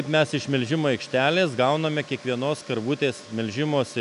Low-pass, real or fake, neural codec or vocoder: 10.8 kHz; real; none